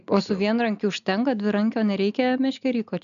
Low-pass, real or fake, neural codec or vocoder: 7.2 kHz; real; none